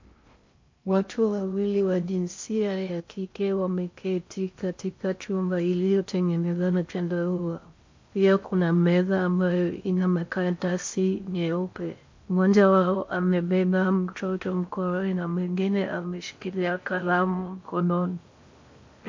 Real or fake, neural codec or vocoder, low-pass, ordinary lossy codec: fake; codec, 16 kHz in and 24 kHz out, 0.6 kbps, FocalCodec, streaming, 2048 codes; 7.2 kHz; MP3, 48 kbps